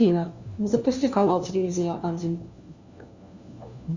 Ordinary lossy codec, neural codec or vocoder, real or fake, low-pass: Opus, 64 kbps; codec, 16 kHz, 1 kbps, FunCodec, trained on LibriTTS, 50 frames a second; fake; 7.2 kHz